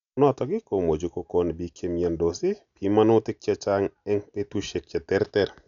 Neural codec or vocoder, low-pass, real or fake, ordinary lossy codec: none; 7.2 kHz; real; none